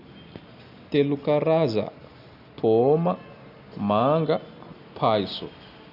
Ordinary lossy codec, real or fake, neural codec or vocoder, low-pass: none; real; none; 5.4 kHz